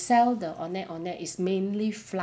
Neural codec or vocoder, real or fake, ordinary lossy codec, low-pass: none; real; none; none